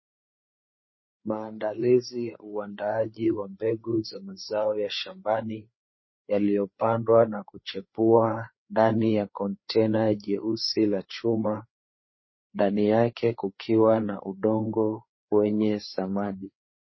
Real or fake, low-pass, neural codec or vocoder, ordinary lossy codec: fake; 7.2 kHz; codec, 16 kHz, 4 kbps, FreqCodec, larger model; MP3, 24 kbps